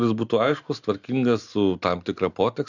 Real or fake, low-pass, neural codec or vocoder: real; 7.2 kHz; none